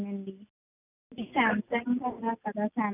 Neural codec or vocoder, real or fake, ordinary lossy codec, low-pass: none; real; none; 3.6 kHz